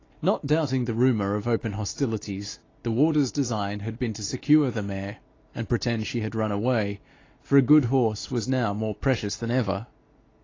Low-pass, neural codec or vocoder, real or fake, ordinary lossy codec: 7.2 kHz; none; real; AAC, 32 kbps